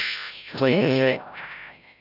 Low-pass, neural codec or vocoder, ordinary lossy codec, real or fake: 5.4 kHz; codec, 16 kHz, 0.5 kbps, FreqCodec, larger model; none; fake